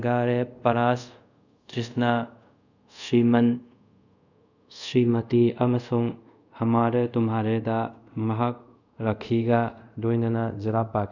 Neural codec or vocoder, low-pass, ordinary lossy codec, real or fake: codec, 24 kHz, 0.5 kbps, DualCodec; 7.2 kHz; none; fake